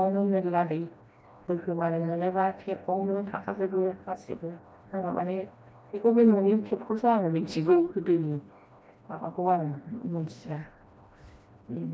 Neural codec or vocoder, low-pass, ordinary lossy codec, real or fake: codec, 16 kHz, 1 kbps, FreqCodec, smaller model; none; none; fake